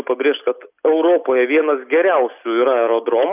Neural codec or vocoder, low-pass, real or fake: none; 3.6 kHz; real